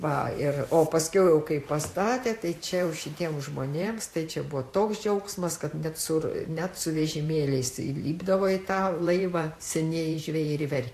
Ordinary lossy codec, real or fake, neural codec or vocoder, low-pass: AAC, 64 kbps; fake; vocoder, 44.1 kHz, 128 mel bands every 512 samples, BigVGAN v2; 14.4 kHz